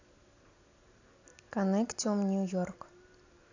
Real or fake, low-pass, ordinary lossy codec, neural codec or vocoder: real; 7.2 kHz; AAC, 48 kbps; none